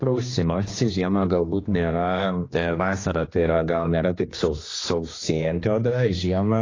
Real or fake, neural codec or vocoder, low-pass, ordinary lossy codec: fake; codec, 16 kHz, 2 kbps, X-Codec, HuBERT features, trained on general audio; 7.2 kHz; AAC, 32 kbps